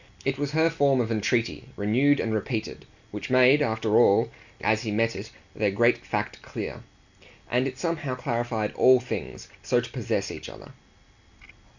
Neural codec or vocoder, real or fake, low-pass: none; real; 7.2 kHz